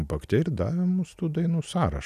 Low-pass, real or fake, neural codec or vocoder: 14.4 kHz; real; none